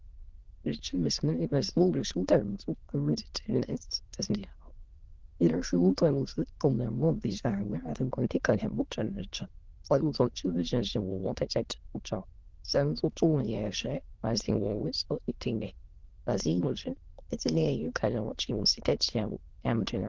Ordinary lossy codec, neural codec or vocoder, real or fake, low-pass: Opus, 16 kbps; autoencoder, 22.05 kHz, a latent of 192 numbers a frame, VITS, trained on many speakers; fake; 7.2 kHz